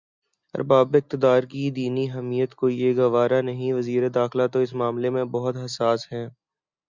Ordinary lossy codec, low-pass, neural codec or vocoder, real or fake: Opus, 64 kbps; 7.2 kHz; none; real